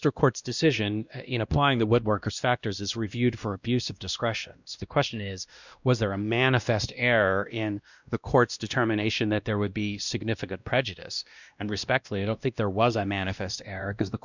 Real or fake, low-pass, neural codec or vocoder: fake; 7.2 kHz; codec, 16 kHz, 1 kbps, X-Codec, WavLM features, trained on Multilingual LibriSpeech